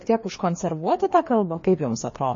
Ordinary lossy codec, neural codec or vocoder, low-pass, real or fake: MP3, 32 kbps; codec, 16 kHz, 2 kbps, FreqCodec, larger model; 7.2 kHz; fake